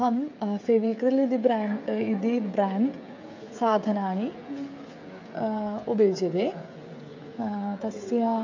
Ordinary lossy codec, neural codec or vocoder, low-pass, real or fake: MP3, 48 kbps; codec, 16 kHz, 16 kbps, FreqCodec, smaller model; 7.2 kHz; fake